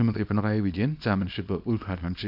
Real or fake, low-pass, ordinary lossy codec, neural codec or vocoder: fake; 5.4 kHz; AAC, 48 kbps; codec, 24 kHz, 0.9 kbps, WavTokenizer, small release